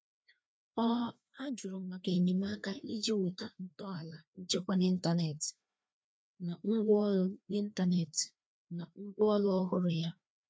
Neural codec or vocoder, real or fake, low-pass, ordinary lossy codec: codec, 16 kHz, 2 kbps, FreqCodec, larger model; fake; none; none